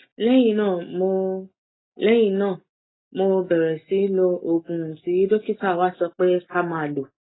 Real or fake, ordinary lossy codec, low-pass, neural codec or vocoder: real; AAC, 16 kbps; 7.2 kHz; none